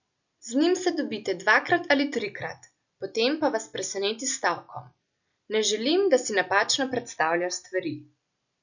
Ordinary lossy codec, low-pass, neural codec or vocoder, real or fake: none; 7.2 kHz; none; real